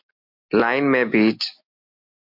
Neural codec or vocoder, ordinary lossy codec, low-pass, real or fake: none; MP3, 48 kbps; 5.4 kHz; real